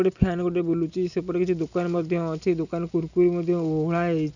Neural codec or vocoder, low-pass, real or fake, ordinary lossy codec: none; 7.2 kHz; real; none